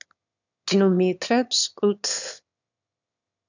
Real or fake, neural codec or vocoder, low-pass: fake; autoencoder, 22.05 kHz, a latent of 192 numbers a frame, VITS, trained on one speaker; 7.2 kHz